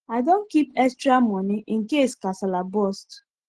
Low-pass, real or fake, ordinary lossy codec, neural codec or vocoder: 10.8 kHz; real; Opus, 16 kbps; none